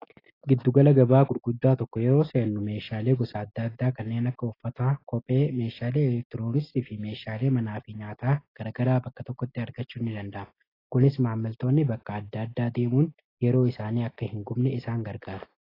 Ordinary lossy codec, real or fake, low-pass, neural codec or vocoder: AAC, 24 kbps; real; 5.4 kHz; none